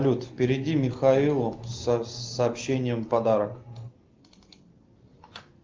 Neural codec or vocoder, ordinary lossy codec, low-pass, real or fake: none; Opus, 32 kbps; 7.2 kHz; real